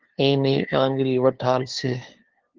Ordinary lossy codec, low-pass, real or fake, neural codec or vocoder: Opus, 16 kbps; 7.2 kHz; fake; codec, 16 kHz, 2 kbps, FunCodec, trained on LibriTTS, 25 frames a second